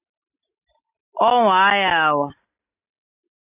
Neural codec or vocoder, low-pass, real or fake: none; 3.6 kHz; real